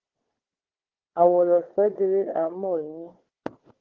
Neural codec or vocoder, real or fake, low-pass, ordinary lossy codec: codec, 16 kHz, 4 kbps, FunCodec, trained on Chinese and English, 50 frames a second; fake; 7.2 kHz; Opus, 16 kbps